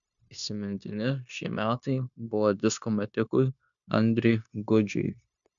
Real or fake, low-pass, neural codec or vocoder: fake; 7.2 kHz; codec, 16 kHz, 0.9 kbps, LongCat-Audio-Codec